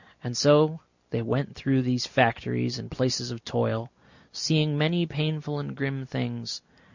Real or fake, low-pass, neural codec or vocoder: real; 7.2 kHz; none